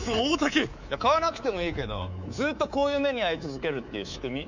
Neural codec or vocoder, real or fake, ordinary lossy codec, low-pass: codec, 24 kHz, 3.1 kbps, DualCodec; fake; none; 7.2 kHz